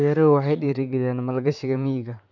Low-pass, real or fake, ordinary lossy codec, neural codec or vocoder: 7.2 kHz; fake; none; vocoder, 44.1 kHz, 128 mel bands, Pupu-Vocoder